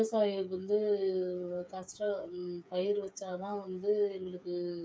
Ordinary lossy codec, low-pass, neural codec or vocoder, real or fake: none; none; codec, 16 kHz, 8 kbps, FreqCodec, smaller model; fake